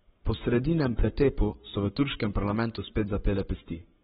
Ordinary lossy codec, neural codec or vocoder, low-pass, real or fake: AAC, 16 kbps; codec, 44.1 kHz, 7.8 kbps, Pupu-Codec; 19.8 kHz; fake